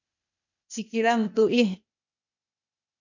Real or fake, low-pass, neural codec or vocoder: fake; 7.2 kHz; codec, 16 kHz, 0.8 kbps, ZipCodec